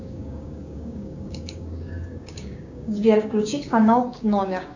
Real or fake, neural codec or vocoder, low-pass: fake; codec, 16 kHz, 6 kbps, DAC; 7.2 kHz